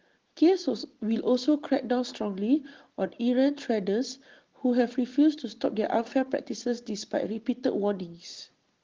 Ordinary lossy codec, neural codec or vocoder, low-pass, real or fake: Opus, 16 kbps; none; 7.2 kHz; real